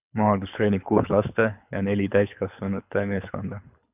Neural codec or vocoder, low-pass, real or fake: codec, 24 kHz, 6 kbps, HILCodec; 3.6 kHz; fake